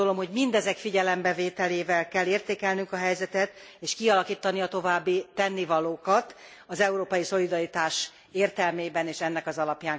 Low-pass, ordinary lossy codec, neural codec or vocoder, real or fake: none; none; none; real